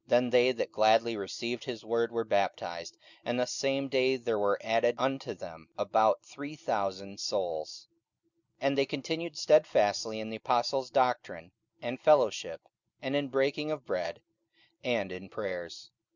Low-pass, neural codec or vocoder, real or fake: 7.2 kHz; none; real